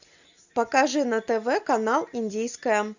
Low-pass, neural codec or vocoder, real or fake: 7.2 kHz; none; real